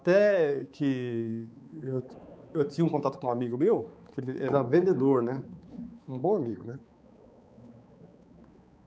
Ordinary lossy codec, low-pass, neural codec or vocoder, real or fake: none; none; codec, 16 kHz, 4 kbps, X-Codec, HuBERT features, trained on balanced general audio; fake